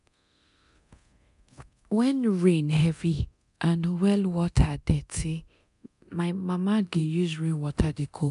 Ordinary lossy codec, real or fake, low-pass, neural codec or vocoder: none; fake; 10.8 kHz; codec, 24 kHz, 0.9 kbps, DualCodec